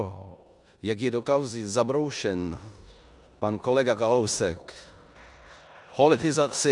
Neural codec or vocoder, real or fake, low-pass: codec, 16 kHz in and 24 kHz out, 0.9 kbps, LongCat-Audio-Codec, four codebook decoder; fake; 10.8 kHz